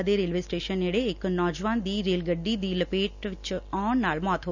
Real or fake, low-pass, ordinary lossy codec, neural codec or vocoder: real; 7.2 kHz; none; none